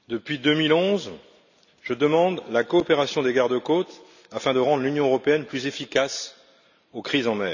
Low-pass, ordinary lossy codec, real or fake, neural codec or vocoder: 7.2 kHz; none; real; none